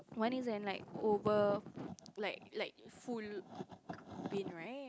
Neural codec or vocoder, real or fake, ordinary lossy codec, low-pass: none; real; none; none